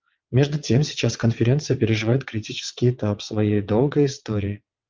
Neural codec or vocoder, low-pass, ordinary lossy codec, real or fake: vocoder, 22.05 kHz, 80 mel bands, WaveNeXt; 7.2 kHz; Opus, 24 kbps; fake